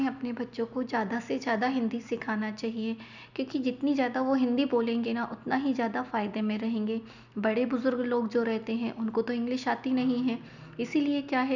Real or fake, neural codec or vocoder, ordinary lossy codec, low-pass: real; none; none; 7.2 kHz